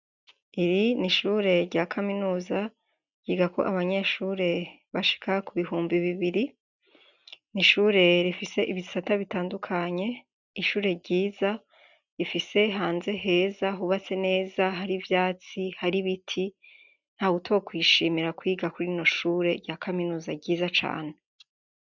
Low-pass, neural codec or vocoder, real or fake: 7.2 kHz; none; real